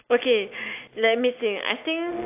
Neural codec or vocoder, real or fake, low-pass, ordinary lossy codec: none; real; 3.6 kHz; AAC, 32 kbps